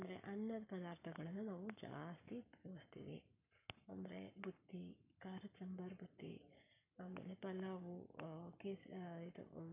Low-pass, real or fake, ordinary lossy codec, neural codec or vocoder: 3.6 kHz; fake; none; codec, 44.1 kHz, 7.8 kbps, Pupu-Codec